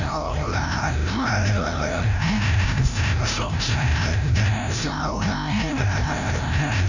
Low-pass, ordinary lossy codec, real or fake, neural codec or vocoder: 7.2 kHz; none; fake; codec, 16 kHz, 0.5 kbps, FreqCodec, larger model